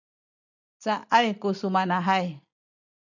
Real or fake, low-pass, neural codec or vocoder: real; 7.2 kHz; none